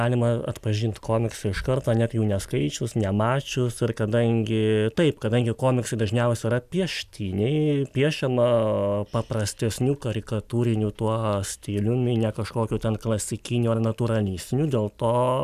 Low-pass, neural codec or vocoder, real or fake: 14.4 kHz; none; real